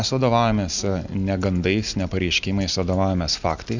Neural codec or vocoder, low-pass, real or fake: none; 7.2 kHz; real